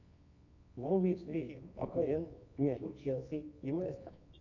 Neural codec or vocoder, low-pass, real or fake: codec, 24 kHz, 0.9 kbps, WavTokenizer, medium music audio release; 7.2 kHz; fake